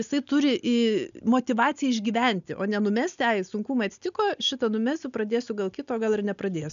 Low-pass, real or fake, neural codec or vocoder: 7.2 kHz; real; none